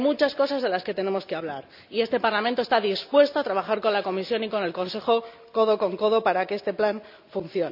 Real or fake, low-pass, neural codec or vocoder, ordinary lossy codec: real; 5.4 kHz; none; none